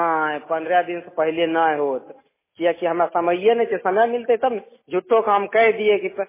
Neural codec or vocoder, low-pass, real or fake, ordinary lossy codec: none; 3.6 kHz; real; MP3, 16 kbps